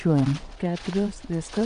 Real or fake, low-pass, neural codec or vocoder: fake; 9.9 kHz; vocoder, 22.05 kHz, 80 mel bands, WaveNeXt